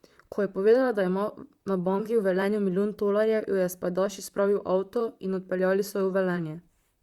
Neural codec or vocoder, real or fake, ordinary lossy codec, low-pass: vocoder, 44.1 kHz, 128 mel bands, Pupu-Vocoder; fake; Opus, 64 kbps; 19.8 kHz